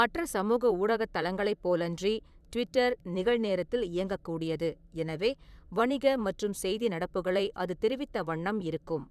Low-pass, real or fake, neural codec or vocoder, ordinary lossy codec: 14.4 kHz; fake; vocoder, 44.1 kHz, 128 mel bands, Pupu-Vocoder; none